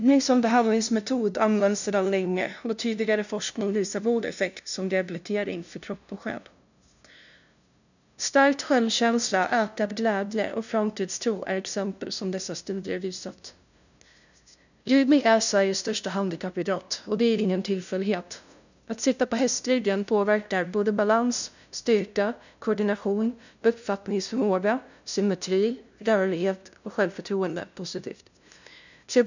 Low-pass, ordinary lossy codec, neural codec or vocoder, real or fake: 7.2 kHz; none; codec, 16 kHz, 0.5 kbps, FunCodec, trained on LibriTTS, 25 frames a second; fake